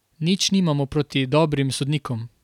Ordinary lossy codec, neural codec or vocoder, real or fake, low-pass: none; none; real; 19.8 kHz